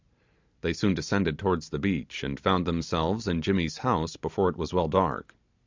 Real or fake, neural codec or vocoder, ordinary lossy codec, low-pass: real; none; MP3, 64 kbps; 7.2 kHz